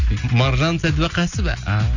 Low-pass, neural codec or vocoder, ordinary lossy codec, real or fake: 7.2 kHz; none; Opus, 64 kbps; real